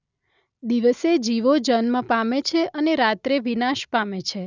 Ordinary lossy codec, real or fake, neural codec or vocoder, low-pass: none; real; none; 7.2 kHz